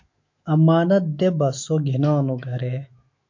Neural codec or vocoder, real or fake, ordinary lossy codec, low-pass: autoencoder, 48 kHz, 128 numbers a frame, DAC-VAE, trained on Japanese speech; fake; MP3, 48 kbps; 7.2 kHz